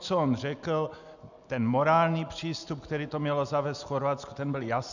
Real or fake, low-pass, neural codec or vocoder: real; 7.2 kHz; none